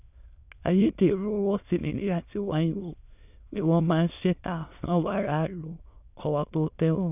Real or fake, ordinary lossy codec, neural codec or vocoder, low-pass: fake; none; autoencoder, 22.05 kHz, a latent of 192 numbers a frame, VITS, trained on many speakers; 3.6 kHz